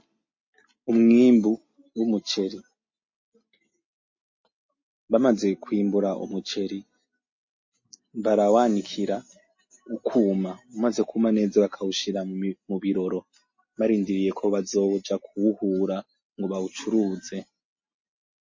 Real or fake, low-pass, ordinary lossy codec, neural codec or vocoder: real; 7.2 kHz; MP3, 32 kbps; none